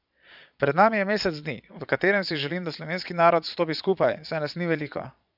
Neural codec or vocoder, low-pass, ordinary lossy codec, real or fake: vocoder, 22.05 kHz, 80 mel bands, Vocos; 5.4 kHz; none; fake